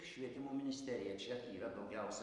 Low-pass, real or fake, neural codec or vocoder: 10.8 kHz; fake; vocoder, 44.1 kHz, 128 mel bands every 256 samples, BigVGAN v2